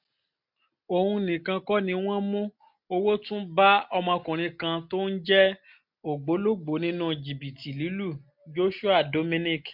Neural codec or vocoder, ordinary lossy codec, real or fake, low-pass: none; MP3, 48 kbps; real; 5.4 kHz